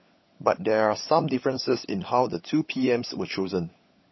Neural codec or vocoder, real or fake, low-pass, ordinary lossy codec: codec, 16 kHz, 16 kbps, FunCodec, trained on LibriTTS, 50 frames a second; fake; 7.2 kHz; MP3, 24 kbps